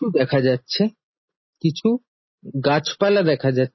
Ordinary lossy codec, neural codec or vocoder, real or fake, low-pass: MP3, 24 kbps; none; real; 7.2 kHz